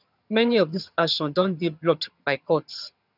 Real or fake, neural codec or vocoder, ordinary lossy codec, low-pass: fake; vocoder, 22.05 kHz, 80 mel bands, HiFi-GAN; none; 5.4 kHz